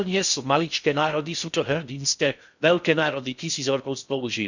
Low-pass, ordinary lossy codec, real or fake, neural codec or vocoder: 7.2 kHz; none; fake; codec, 16 kHz in and 24 kHz out, 0.6 kbps, FocalCodec, streaming, 2048 codes